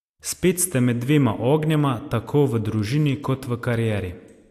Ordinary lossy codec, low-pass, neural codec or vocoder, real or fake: AAC, 64 kbps; 14.4 kHz; none; real